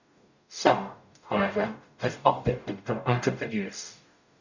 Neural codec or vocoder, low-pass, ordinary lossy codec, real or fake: codec, 44.1 kHz, 0.9 kbps, DAC; 7.2 kHz; none; fake